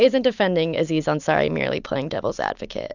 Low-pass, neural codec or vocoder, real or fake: 7.2 kHz; none; real